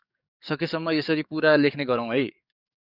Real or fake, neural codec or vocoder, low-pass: fake; codec, 44.1 kHz, 7.8 kbps, DAC; 5.4 kHz